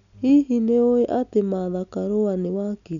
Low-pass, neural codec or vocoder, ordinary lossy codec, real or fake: 7.2 kHz; none; none; real